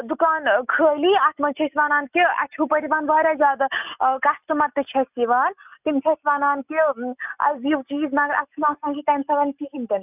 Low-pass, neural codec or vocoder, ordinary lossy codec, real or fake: 3.6 kHz; none; none; real